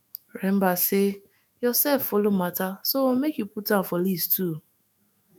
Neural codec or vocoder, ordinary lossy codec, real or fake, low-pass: autoencoder, 48 kHz, 128 numbers a frame, DAC-VAE, trained on Japanese speech; none; fake; none